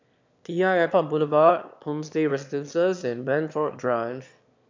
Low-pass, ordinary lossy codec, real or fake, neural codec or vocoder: 7.2 kHz; MP3, 64 kbps; fake; autoencoder, 22.05 kHz, a latent of 192 numbers a frame, VITS, trained on one speaker